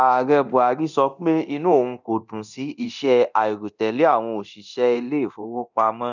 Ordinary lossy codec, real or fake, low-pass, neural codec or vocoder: none; fake; 7.2 kHz; codec, 24 kHz, 0.9 kbps, DualCodec